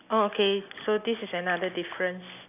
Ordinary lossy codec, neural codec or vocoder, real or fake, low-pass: none; none; real; 3.6 kHz